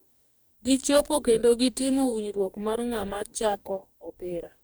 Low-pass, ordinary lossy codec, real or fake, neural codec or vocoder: none; none; fake; codec, 44.1 kHz, 2.6 kbps, DAC